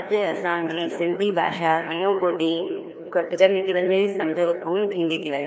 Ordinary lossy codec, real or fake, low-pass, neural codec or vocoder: none; fake; none; codec, 16 kHz, 1 kbps, FreqCodec, larger model